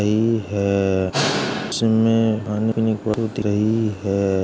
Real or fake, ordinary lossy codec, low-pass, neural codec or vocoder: real; none; none; none